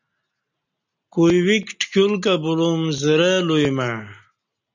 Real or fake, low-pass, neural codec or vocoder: real; 7.2 kHz; none